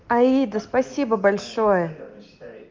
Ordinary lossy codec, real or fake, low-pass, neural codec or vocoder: Opus, 32 kbps; real; 7.2 kHz; none